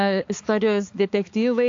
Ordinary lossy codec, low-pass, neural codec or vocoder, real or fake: AAC, 48 kbps; 7.2 kHz; codec, 16 kHz, 4 kbps, X-Codec, HuBERT features, trained on balanced general audio; fake